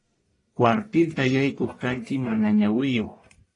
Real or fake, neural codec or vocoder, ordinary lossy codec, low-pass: fake; codec, 44.1 kHz, 1.7 kbps, Pupu-Codec; AAC, 32 kbps; 10.8 kHz